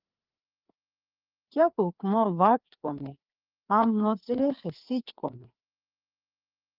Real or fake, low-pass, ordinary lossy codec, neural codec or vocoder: fake; 5.4 kHz; Opus, 32 kbps; codec, 16 kHz, 4 kbps, FreqCodec, larger model